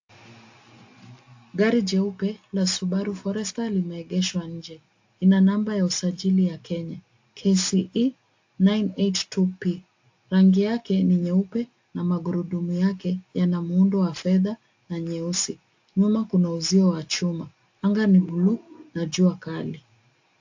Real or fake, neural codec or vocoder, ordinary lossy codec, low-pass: real; none; AAC, 48 kbps; 7.2 kHz